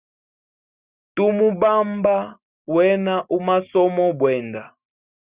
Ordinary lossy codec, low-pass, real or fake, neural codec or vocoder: Opus, 64 kbps; 3.6 kHz; real; none